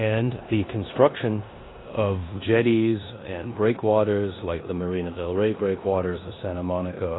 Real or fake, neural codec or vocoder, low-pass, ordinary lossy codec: fake; codec, 16 kHz in and 24 kHz out, 0.9 kbps, LongCat-Audio-Codec, four codebook decoder; 7.2 kHz; AAC, 16 kbps